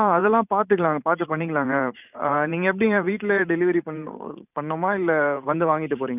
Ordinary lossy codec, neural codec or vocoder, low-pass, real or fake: none; vocoder, 44.1 kHz, 128 mel bands every 512 samples, BigVGAN v2; 3.6 kHz; fake